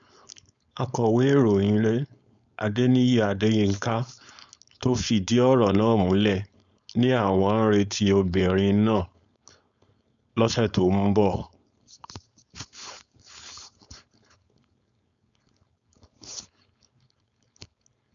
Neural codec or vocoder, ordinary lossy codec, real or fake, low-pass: codec, 16 kHz, 4.8 kbps, FACodec; none; fake; 7.2 kHz